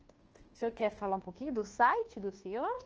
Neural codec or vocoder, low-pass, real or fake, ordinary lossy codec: codec, 24 kHz, 1.2 kbps, DualCodec; 7.2 kHz; fake; Opus, 16 kbps